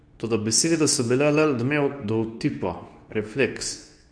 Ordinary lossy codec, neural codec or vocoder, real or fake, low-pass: none; codec, 24 kHz, 0.9 kbps, WavTokenizer, medium speech release version 2; fake; 9.9 kHz